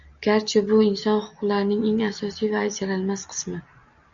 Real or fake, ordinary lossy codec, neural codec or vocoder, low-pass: real; Opus, 64 kbps; none; 7.2 kHz